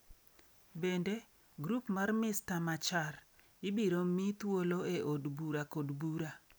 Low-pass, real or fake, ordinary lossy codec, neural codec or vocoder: none; real; none; none